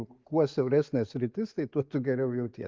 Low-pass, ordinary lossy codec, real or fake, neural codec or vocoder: 7.2 kHz; Opus, 32 kbps; real; none